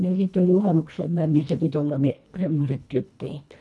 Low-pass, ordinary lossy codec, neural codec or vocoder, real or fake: none; none; codec, 24 kHz, 1.5 kbps, HILCodec; fake